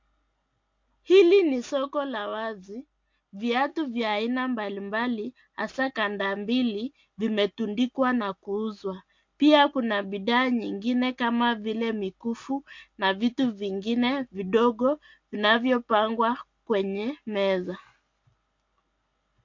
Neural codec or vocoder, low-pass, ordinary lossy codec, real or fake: none; 7.2 kHz; MP3, 48 kbps; real